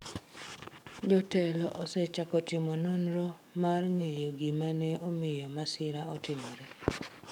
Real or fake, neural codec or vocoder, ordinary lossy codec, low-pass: fake; codec, 44.1 kHz, 7.8 kbps, DAC; none; 19.8 kHz